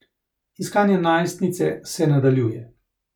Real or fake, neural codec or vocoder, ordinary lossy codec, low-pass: real; none; none; 19.8 kHz